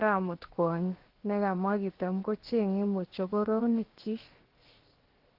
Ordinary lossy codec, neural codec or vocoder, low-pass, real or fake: Opus, 16 kbps; codec, 16 kHz, 0.7 kbps, FocalCodec; 5.4 kHz; fake